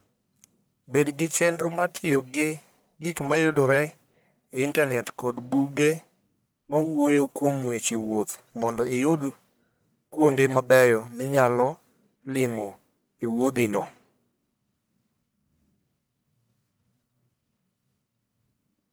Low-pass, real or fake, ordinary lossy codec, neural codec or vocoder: none; fake; none; codec, 44.1 kHz, 1.7 kbps, Pupu-Codec